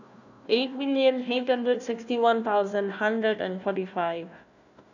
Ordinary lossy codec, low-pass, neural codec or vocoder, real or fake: none; 7.2 kHz; codec, 16 kHz, 1 kbps, FunCodec, trained on Chinese and English, 50 frames a second; fake